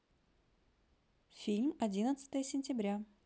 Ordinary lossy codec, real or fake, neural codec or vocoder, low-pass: none; real; none; none